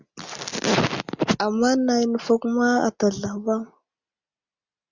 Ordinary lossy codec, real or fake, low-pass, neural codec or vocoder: Opus, 64 kbps; real; 7.2 kHz; none